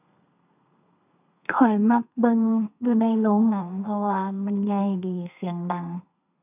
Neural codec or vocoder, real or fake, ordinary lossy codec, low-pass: codec, 32 kHz, 1.9 kbps, SNAC; fake; none; 3.6 kHz